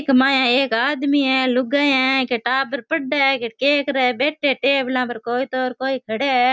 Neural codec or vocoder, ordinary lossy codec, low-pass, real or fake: none; none; none; real